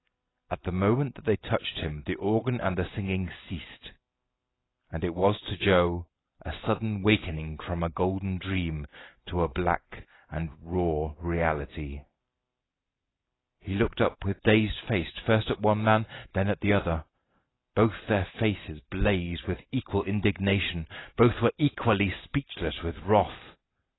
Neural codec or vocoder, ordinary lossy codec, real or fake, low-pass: none; AAC, 16 kbps; real; 7.2 kHz